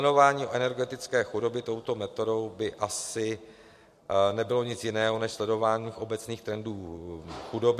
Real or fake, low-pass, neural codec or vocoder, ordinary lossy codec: real; 14.4 kHz; none; MP3, 64 kbps